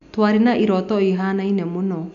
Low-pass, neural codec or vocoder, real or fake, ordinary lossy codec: 7.2 kHz; none; real; none